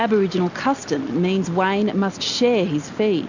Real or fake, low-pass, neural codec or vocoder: real; 7.2 kHz; none